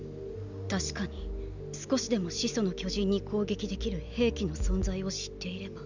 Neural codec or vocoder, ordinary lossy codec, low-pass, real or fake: none; none; 7.2 kHz; real